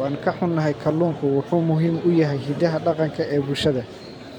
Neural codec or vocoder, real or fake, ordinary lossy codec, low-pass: vocoder, 44.1 kHz, 128 mel bands every 512 samples, BigVGAN v2; fake; none; 19.8 kHz